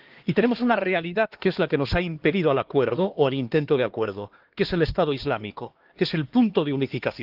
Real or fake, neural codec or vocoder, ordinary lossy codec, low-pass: fake; codec, 16 kHz, 2 kbps, X-Codec, HuBERT features, trained on LibriSpeech; Opus, 16 kbps; 5.4 kHz